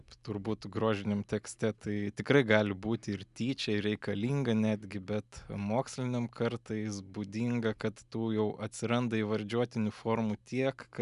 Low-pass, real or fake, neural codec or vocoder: 10.8 kHz; real; none